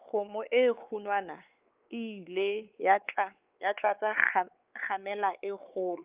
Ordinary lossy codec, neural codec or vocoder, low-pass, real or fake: Opus, 24 kbps; codec, 16 kHz, 4 kbps, X-Codec, WavLM features, trained on Multilingual LibriSpeech; 3.6 kHz; fake